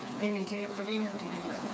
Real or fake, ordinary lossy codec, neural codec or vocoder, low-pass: fake; none; codec, 16 kHz, 4 kbps, FreqCodec, smaller model; none